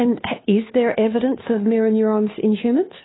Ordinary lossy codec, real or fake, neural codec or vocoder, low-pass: AAC, 16 kbps; fake; codec, 16 kHz, 2 kbps, FunCodec, trained on Chinese and English, 25 frames a second; 7.2 kHz